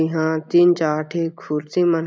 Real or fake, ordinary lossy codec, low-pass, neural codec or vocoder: real; none; none; none